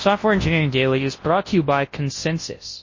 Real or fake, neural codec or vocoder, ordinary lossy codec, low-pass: fake; codec, 24 kHz, 0.9 kbps, WavTokenizer, large speech release; MP3, 32 kbps; 7.2 kHz